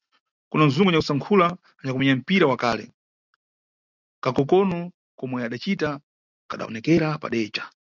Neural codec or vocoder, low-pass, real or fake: none; 7.2 kHz; real